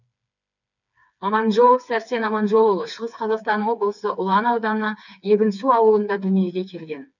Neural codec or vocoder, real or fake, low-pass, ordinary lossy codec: codec, 16 kHz, 4 kbps, FreqCodec, smaller model; fake; 7.2 kHz; none